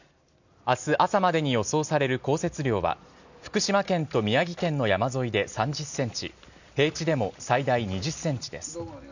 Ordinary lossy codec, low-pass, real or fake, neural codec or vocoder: none; 7.2 kHz; real; none